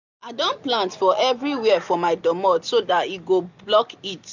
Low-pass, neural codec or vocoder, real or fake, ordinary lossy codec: 7.2 kHz; none; real; none